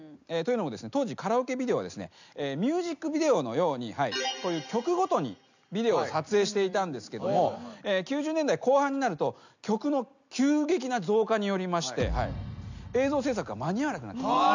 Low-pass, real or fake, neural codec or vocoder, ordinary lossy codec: 7.2 kHz; real; none; none